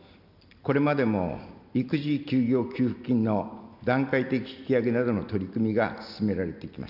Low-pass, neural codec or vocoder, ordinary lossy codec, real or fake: 5.4 kHz; none; none; real